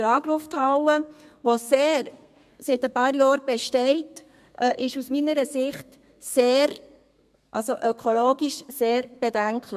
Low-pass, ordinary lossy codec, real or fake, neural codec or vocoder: 14.4 kHz; MP3, 96 kbps; fake; codec, 32 kHz, 1.9 kbps, SNAC